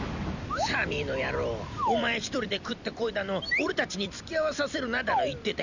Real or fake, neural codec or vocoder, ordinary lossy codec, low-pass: fake; vocoder, 44.1 kHz, 128 mel bands every 256 samples, BigVGAN v2; none; 7.2 kHz